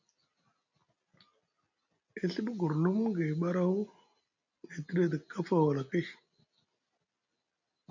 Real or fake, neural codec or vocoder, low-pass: real; none; 7.2 kHz